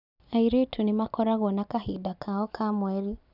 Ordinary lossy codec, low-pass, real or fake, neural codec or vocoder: none; 5.4 kHz; real; none